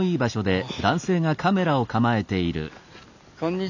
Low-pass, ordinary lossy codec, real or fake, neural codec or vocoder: 7.2 kHz; none; real; none